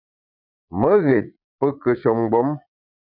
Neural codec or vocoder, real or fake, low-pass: vocoder, 22.05 kHz, 80 mel bands, Vocos; fake; 5.4 kHz